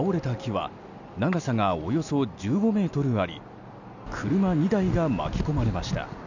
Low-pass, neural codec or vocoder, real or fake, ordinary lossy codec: 7.2 kHz; none; real; none